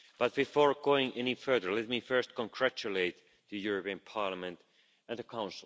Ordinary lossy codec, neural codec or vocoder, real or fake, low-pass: none; none; real; none